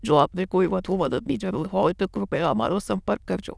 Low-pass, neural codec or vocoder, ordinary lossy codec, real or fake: none; autoencoder, 22.05 kHz, a latent of 192 numbers a frame, VITS, trained on many speakers; none; fake